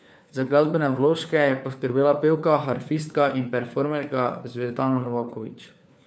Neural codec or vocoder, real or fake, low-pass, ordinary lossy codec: codec, 16 kHz, 4 kbps, FunCodec, trained on LibriTTS, 50 frames a second; fake; none; none